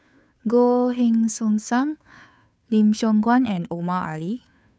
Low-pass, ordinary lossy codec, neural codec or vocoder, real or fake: none; none; codec, 16 kHz, 2 kbps, FunCodec, trained on Chinese and English, 25 frames a second; fake